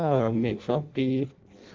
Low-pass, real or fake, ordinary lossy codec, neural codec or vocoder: 7.2 kHz; fake; Opus, 32 kbps; codec, 16 kHz in and 24 kHz out, 0.6 kbps, FireRedTTS-2 codec